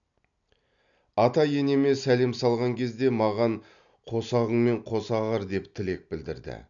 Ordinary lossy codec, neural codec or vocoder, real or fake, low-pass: none; none; real; 7.2 kHz